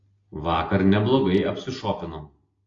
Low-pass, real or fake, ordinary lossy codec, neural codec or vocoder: 7.2 kHz; real; AAC, 32 kbps; none